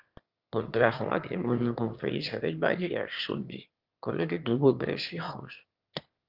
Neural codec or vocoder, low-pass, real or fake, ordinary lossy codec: autoencoder, 22.05 kHz, a latent of 192 numbers a frame, VITS, trained on one speaker; 5.4 kHz; fake; Opus, 24 kbps